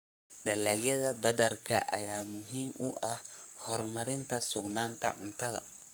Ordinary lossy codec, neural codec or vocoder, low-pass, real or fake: none; codec, 44.1 kHz, 3.4 kbps, Pupu-Codec; none; fake